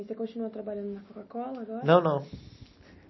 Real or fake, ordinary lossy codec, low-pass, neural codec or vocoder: real; MP3, 24 kbps; 7.2 kHz; none